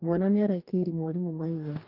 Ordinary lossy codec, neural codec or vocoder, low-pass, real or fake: Opus, 32 kbps; codec, 44.1 kHz, 2.6 kbps, DAC; 5.4 kHz; fake